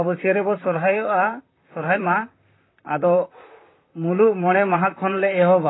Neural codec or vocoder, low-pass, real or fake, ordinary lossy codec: vocoder, 44.1 kHz, 128 mel bands, Pupu-Vocoder; 7.2 kHz; fake; AAC, 16 kbps